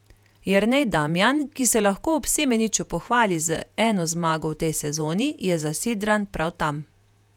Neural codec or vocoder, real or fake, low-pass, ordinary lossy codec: vocoder, 48 kHz, 128 mel bands, Vocos; fake; 19.8 kHz; none